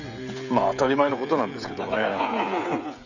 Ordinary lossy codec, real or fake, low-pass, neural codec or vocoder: none; fake; 7.2 kHz; codec, 16 kHz, 16 kbps, FreqCodec, smaller model